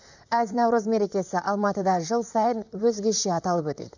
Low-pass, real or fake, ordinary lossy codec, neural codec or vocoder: 7.2 kHz; fake; none; vocoder, 44.1 kHz, 128 mel bands, Pupu-Vocoder